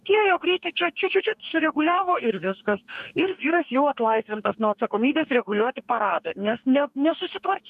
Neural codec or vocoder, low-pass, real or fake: codec, 44.1 kHz, 2.6 kbps, DAC; 14.4 kHz; fake